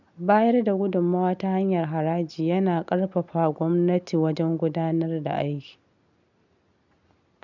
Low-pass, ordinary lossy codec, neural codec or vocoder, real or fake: 7.2 kHz; none; none; real